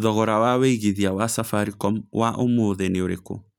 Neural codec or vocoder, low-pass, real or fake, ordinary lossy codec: none; 19.8 kHz; real; none